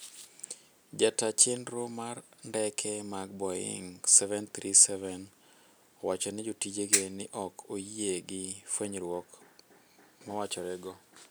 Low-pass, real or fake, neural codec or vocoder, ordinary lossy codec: none; real; none; none